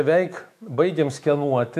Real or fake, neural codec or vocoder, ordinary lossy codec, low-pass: fake; autoencoder, 48 kHz, 128 numbers a frame, DAC-VAE, trained on Japanese speech; AAC, 96 kbps; 14.4 kHz